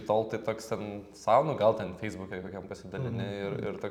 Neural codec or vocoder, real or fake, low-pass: vocoder, 44.1 kHz, 128 mel bands every 256 samples, BigVGAN v2; fake; 19.8 kHz